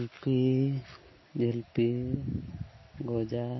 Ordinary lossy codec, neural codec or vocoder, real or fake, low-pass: MP3, 24 kbps; none; real; 7.2 kHz